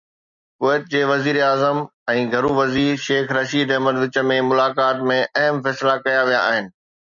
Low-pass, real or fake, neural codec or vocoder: 7.2 kHz; real; none